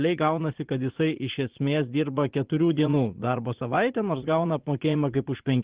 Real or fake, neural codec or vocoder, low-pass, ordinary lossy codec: fake; vocoder, 44.1 kHz, 80 mel bands, Vocos; 3.6 kHz; Opus, 16 kbps